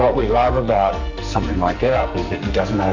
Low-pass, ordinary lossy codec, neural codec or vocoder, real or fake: 7.2 kHz; MP3, 64 kbps; codec, 44.1 kHz, 2.6 kbps, SNAC; fake